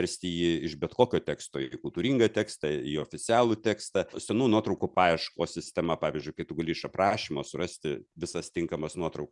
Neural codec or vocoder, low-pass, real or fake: none; 10.8 kHz; real